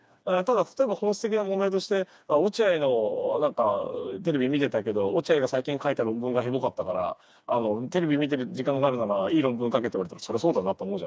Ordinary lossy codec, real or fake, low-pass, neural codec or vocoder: none; fake; none; codec, 16 kHz, 2 kbps, FreqCodec, smaller model